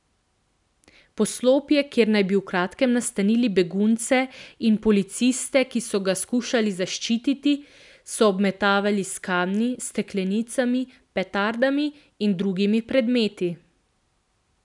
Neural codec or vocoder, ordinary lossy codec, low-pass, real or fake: none; none; 10.8 kHz; real